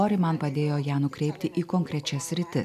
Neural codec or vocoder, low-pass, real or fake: none; 14.4 kHz; real